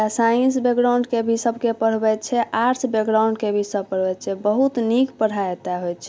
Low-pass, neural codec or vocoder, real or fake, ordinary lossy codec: none; none; real; none